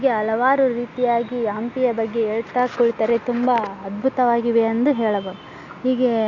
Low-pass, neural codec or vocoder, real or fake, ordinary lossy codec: 7.2 kHz; none; real; Opus, 64 kbps